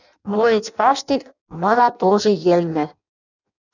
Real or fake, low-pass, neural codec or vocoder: fake; 7.2 kHz; codec, 16 kHz in and 24 kHz out, 0.6 kbps, FireRedTTS-2 codec